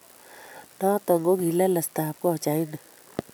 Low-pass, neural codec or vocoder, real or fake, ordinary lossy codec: none; none; real; none